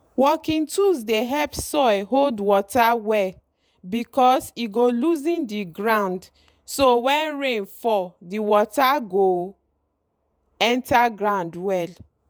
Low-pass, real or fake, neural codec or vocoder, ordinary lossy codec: none; fake; vocoder, 48 kHz, 128 mel bands, Vocos; none